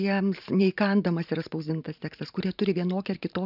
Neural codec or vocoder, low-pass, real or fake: vocoder, 44.1 kHz, 128 mel bands every 512 samples, BigVGAN v2; 5.4 kHz; fake